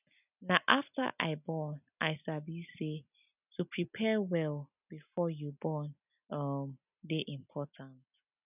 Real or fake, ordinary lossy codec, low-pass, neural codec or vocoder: real; none; 3.6 kHz; none